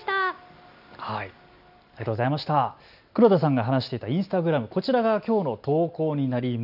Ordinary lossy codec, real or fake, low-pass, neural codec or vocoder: none; real; 5.4 kHz; none